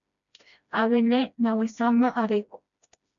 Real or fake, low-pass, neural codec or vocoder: fake; 7.2 kHz; codec, 16 kHz, 1 kbps, FreqCodec, smaller model